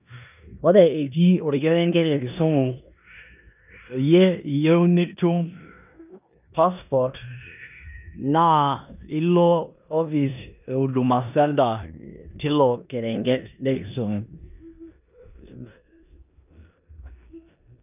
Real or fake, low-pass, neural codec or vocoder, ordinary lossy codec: fake; 3.6 kHz; codec, 16 kHz in and 24 kHz out, 0.9 kbps, LongCat-Audio-Codec, four codebook decoder; none